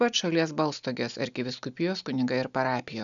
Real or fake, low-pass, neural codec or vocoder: real; 7.2 kHz; none